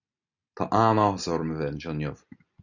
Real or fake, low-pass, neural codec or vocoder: real; 7.2 kHz; none